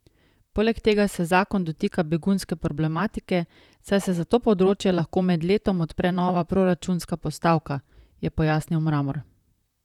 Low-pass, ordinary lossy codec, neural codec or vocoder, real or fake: 19.8 kHz; none; vocoder, 44.1 kHz, 128 mel bands, Pupu-Vocoder; fake